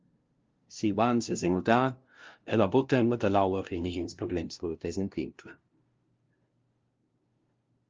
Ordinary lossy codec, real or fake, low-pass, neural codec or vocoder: Opus, 24 kbps; fake; 7.2 kHz; codec, 16 kHz, 0.5 kbps, FunCodec, trained on LibriTTS, 25 frames a second